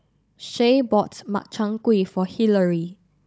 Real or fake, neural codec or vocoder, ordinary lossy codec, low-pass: fake; codec, 16 kHz, 16 kbps, FunCodec, trained on Chinese and English, 50 frames a second; none; none